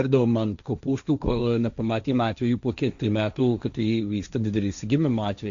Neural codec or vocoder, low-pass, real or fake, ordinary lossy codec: codec, 16 kHz, 1.1 kbps, Voila-Tokenizer; 7.2 kHz; fake; AAC, 96 kbps